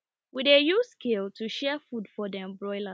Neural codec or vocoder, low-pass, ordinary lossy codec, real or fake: none; none; none; real